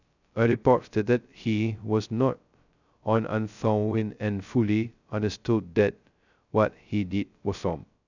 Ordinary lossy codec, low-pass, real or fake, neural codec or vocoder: none; 7.2 kHz; fake; codec, 16 kHz, 0.2 kbps, FocalCodec